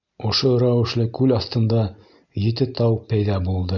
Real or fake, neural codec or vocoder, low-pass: real; none; 7.2 kHz